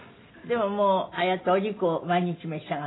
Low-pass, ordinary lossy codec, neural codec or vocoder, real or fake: 7.2 kHz; AAC, 16 kbps; none; real